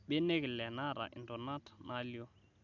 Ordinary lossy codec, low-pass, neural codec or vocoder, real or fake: none; 7.2 kHz; none; real